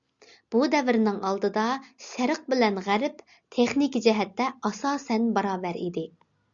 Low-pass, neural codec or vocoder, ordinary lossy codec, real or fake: 7.2 kHz; none; Opus, 64 kbps; real